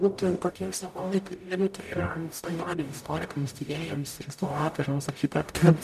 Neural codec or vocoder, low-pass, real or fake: codec, 44.1 kHz, 0.9 kbps, DAC; 14.4 kHz; fake